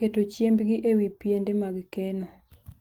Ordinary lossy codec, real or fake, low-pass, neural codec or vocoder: Opus, 24 kbps; fake; 19.8 kHz; vocoder, 44.1 kHz, 128 mel bands every 256 samples, BigVGAN v2